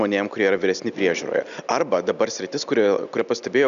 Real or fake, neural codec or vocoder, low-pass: real; none; 7.2 kHz